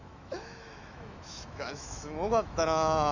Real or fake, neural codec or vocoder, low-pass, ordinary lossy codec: fake; autoencoder, 48 kHz, 128 numbers a frame, DAC-VAE, trained on Japanese speech; 7.2 kHz; none